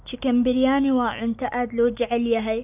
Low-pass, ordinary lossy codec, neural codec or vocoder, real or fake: 3.6 kHz; AAC, 32 kbps; none; real